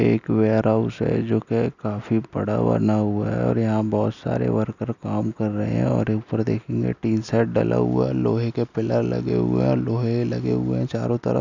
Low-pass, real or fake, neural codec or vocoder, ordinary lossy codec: 7.2 kHz; real; none; none